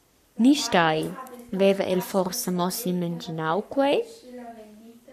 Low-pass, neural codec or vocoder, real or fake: 14.4 kHz; codec, 44.1 kHz, 7.8 kbps, Pupu-Codec; fake